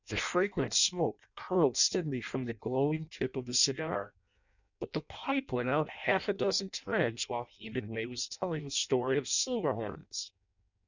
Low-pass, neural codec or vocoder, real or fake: 7.2 kHz; codec, 16 kHz in and 24 kHz out, 0.6 kbps, FireRedTTS-2 codec; fake